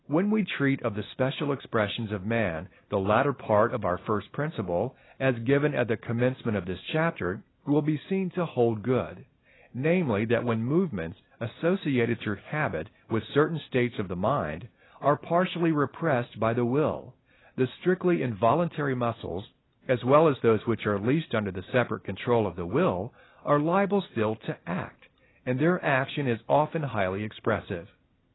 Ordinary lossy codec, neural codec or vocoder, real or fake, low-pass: AAC, 16 kbps; none; real; 7.2 kHz